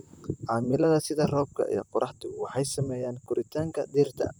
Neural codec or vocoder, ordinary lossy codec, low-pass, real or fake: vocoder, 44.1 kHz, 128 mel bands, Pupu-Vocoder; none; none; fake